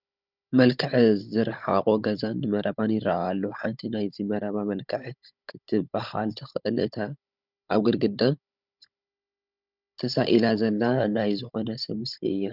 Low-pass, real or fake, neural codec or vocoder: 5.4 kHz; fake; codec, 16 kHz, 16 kbps, FunCodec, trained on Chinese and English, 50 frames a second